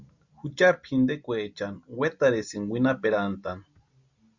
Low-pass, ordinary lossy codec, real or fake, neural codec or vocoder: 7.2 kHz; Opus, 64 kbps; real; none